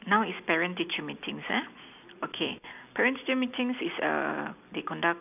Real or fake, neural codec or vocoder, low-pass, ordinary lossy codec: real; none; 3.6 kHz; none